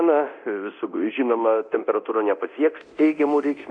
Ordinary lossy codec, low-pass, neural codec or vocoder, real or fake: MP3, 96 kbps; 9.9 kHz; codec, 24 kHz, 0.9 kbps, DualCodec; fake